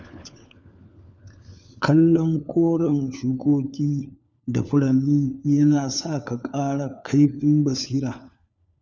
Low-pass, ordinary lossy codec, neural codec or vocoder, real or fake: none; none; codec, 16 kHz, 4 kbps, FunCodec, trained on LibriTTS, 50 frames a second; fake